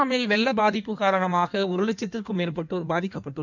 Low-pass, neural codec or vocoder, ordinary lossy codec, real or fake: 7.2 kHz; codec, 16 kHz in and 24 kHz out, 1.1 kbps, FireRedTTS-2 codec; none; fake